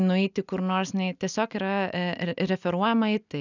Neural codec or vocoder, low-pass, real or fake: none; 7.2 kHz; real